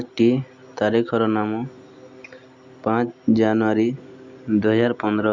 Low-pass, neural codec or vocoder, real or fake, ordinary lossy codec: 7.2 kHz; none; real; MP3, 64 kbps